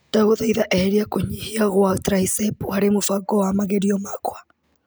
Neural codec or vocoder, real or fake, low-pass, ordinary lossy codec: none; real; none; none